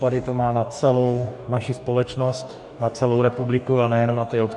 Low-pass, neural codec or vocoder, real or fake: 10.8 kHz; codec, 44.1 kHz, 2.6 kbps, DAC; fake